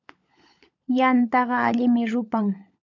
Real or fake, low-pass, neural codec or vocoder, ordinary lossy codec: fake; 7.2 kHz; codec, 16 kHz, 16 kbps, FunCodec, trained on LibriTTS, 50 frames a second; MP3, 64 kbps